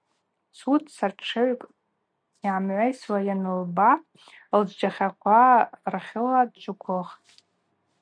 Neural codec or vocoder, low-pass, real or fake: none; 9.9 kHz; real